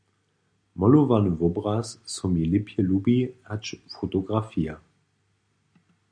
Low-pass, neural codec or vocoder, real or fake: 9.9 kHz; none; real